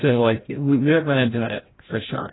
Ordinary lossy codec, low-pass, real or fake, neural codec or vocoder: AAC, 16 kbps; 7.2 kHz; fake; codec, 16 kHz, 0.5 kbps, FreqCodec, larger model